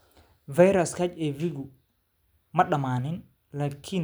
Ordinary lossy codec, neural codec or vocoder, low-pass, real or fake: none; none; none; real